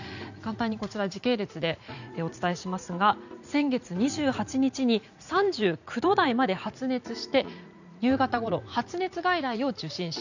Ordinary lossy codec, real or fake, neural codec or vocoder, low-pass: none; fake; vocoder, 44.1 kHz, 80 mel bands, Vocos; 7.2 kHz